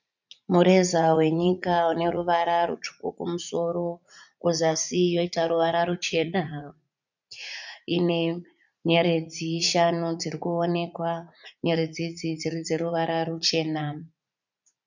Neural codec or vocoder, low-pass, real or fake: vocoder, 44.1 kHz, 80 mel bands, Vocos; 7.2 kHz; fake